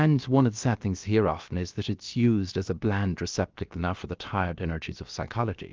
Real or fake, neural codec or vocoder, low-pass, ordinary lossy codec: fake; codec, 16 kHz, about 1 kbps, DyCAST, with the encoder's durations; 7.2 kHz; Opus, 32 kbps